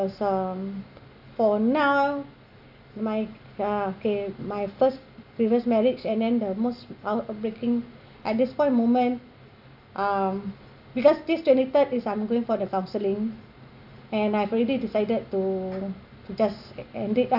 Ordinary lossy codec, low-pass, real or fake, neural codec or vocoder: AAC, 48 kbps; 5.4 kHz; real; none